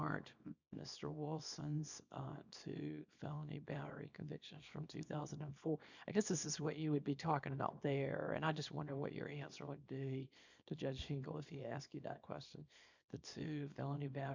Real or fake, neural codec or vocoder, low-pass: fake; codec, 24 kHz, 0.9 kbps, WavTokenizer, small release; 7.2 kHz